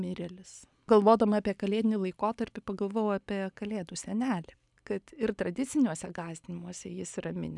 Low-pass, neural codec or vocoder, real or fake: 10.8 kHz; none; real